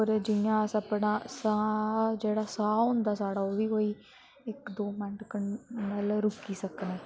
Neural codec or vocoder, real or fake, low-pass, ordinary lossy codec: none; real; none; none